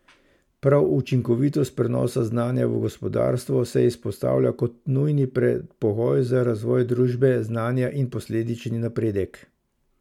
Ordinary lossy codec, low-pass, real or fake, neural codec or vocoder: MP3, 96 kbps; 19.8 kHz; real; none